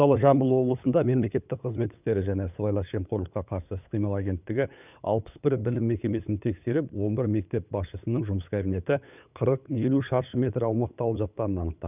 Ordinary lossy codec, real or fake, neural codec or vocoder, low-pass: none; fake; codec, 16 kHz, 8 kbps, FunCodec, trained on LibriTTS, 25 frames a second; 3.6 kHz